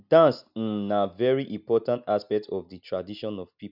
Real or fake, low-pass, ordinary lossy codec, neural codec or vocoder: real; 5.4 kHz; none; none